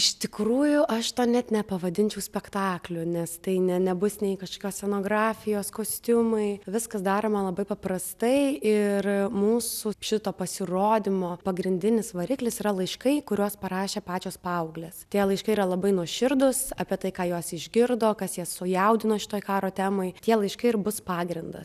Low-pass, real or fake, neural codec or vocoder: 14.4 kHz; real; none